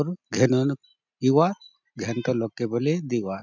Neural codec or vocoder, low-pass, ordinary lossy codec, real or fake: none; 7.2 kHz; none; real